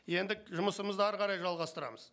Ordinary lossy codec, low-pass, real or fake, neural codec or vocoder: none; none; real; none